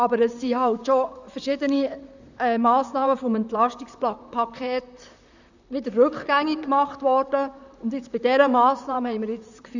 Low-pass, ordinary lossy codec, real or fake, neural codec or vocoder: 7.2 kHz; none; fake; vocoder, 44.1 kHz, 128 mel bands, Pupu-Vocoder